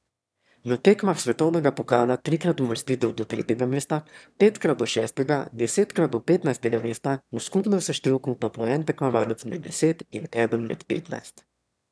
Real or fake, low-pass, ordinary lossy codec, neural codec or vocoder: fake; none; none; autoencoder, 22.05 kHz, a latent of 192 numbers a frame, VITS, trained on one speaker